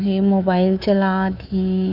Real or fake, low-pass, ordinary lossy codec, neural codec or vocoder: fake; 5.4 kHz; none; codec, 24 kHz, 3.1 kbps, DualCodec